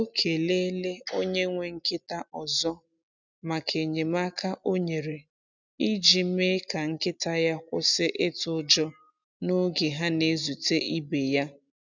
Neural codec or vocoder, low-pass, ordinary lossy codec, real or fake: none; 7.2 kHz; none; real